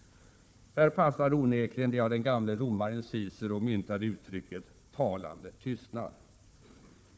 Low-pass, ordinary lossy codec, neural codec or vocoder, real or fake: none; none; codec, 16 kHz, 4 kbps, FunCodec, trained on Chinese and English, 50 frames a second; fake